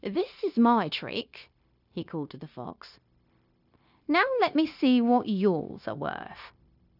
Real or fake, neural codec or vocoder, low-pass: fake; codec, 16 kHz, 0.9 kbps, LongCat-Audio-Codec; 5.4 kHz